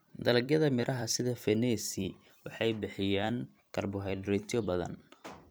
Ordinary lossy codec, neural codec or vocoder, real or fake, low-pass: none; vocoder, 44.1 kHz, 128 mel bands every 256 samples, BigVGAN v2; fake; none